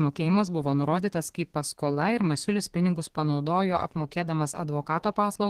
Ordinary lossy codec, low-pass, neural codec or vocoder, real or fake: Opus, 16 kbps; 14.4 kHz; codec, 32 kHz, 1.9 kbps, SNAC; fake